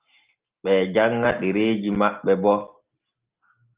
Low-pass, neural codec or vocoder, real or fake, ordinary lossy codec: 3.6 kHz; none; real; Opus, 32 kbps